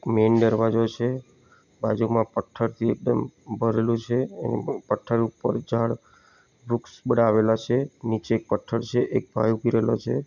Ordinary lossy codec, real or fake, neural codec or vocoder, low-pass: none; real; none; 7.2 kHz